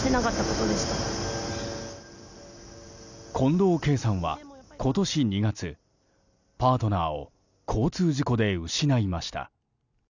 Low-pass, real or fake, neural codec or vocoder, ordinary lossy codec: 7.2 kHz; real; none; none